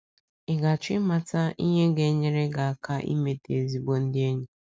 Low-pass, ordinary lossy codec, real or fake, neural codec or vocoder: none; none; real; none